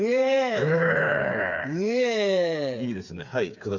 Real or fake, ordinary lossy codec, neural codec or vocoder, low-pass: fake; none; codec, 16 kHz, 4 kbps, FreqCodec, smaller model; 7.2 kHz